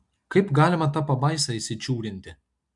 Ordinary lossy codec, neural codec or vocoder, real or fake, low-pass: MP3, 64 kbps; none; real; 10.8 kHz